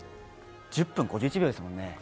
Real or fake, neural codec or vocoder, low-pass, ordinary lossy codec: real; none; none; none